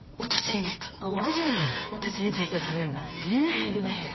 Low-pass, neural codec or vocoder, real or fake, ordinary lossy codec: 7.2 kHz; codec, 24 kHz, 0.9 kbps, WavTokenizer, medium music audio release; fake; MP3, 24 kbps